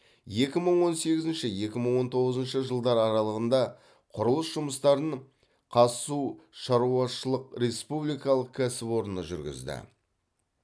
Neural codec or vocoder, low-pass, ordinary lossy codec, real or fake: none; none; none; real